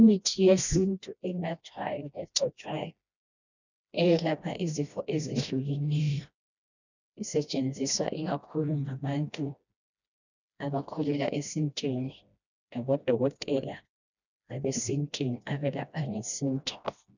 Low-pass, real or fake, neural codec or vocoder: 7.2 kHz; fake; codec, 16 kHz, 1 kbps, FreqCodec, smaller model